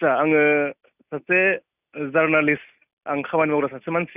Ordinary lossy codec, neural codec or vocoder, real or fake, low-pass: none; none; real; 3.6 kHz